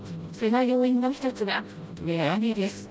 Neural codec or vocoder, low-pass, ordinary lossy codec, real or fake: codec, 16 kHz, 0.5 kbps, FreqCodec, smaller model; none; none; fake